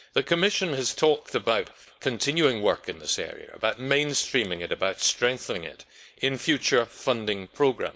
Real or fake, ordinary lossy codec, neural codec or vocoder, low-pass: fake; none; codec, 16 kHz, 4.8 kbps, FACodec; none